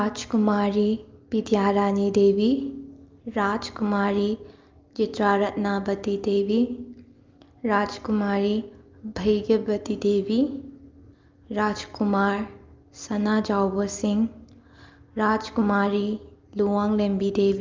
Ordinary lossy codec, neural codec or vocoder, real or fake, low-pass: Opus, 32 kbps; none; real; 7.2 kHz